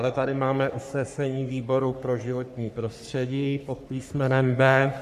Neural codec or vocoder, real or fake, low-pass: codec, 44.1 kHz, 3.4 kbps, Pupu-Codec; fake; 14.4 kHz